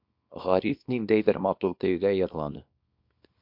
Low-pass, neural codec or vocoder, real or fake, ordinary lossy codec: 5.4 kHz; codec, 24 kHz, 0.9 kbps, WavTokenizer, small release; fake; MP3, 48 kbps